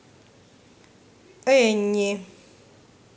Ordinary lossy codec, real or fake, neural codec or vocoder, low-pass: none; real; none; none